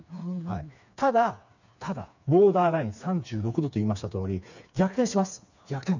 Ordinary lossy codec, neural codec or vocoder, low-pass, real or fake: none; codec, 16 kHz, 4 kbps, FreqCodec, smaller model; 7.2 kHz; fake